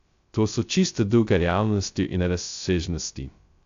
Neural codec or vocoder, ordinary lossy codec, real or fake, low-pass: codec, 16 kHz, 0.2 kbps, FocalCodec; AAC, 64 kbps; fake; 7.2 kHz